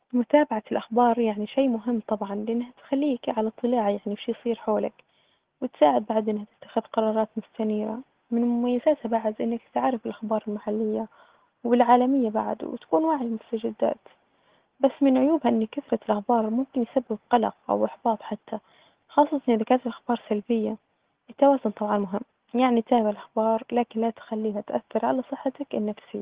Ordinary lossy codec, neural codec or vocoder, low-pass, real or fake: Opus, 16 kbps; none; 3.6 kHz; real